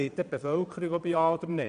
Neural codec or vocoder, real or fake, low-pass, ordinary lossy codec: vocoder, 22.05 kHz, 80 mel bands, Vocos; fake; 9.9 kHz; none